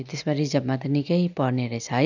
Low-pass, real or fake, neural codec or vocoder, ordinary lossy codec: 7.2 kHz; real; none; none